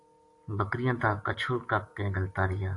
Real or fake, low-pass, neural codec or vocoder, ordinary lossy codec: real; 10.8 kHz; none; AAC, 48 kbps